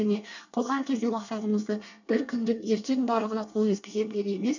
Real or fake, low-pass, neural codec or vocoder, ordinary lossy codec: fake; 7.2 kHz; codec, 24 kHz, 1 kbps, SNAC; none